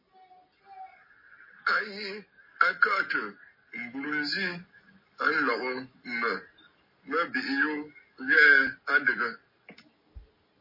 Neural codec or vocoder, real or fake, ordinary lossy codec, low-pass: none; real; MP3, 24 kbps; 5.4 kHz